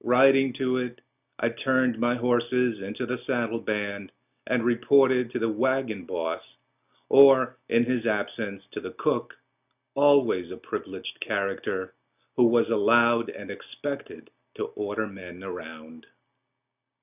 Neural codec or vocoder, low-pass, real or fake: none; 3.6 kHz; real